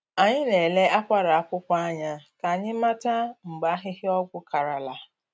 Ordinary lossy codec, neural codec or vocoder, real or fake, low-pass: none; none; real; none